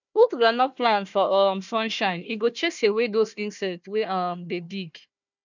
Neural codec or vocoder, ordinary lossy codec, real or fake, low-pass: codec, 16 kHz, 1 kbps, FunCodec, trained on Chinese and English, 50 frames a second; none; fake; 7.2 kHz